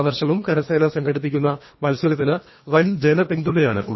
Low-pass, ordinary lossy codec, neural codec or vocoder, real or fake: 7.2 kHz; MP3, 24 kbps; codec, 24 kHz, 1.5 kbps, HILCodec; fake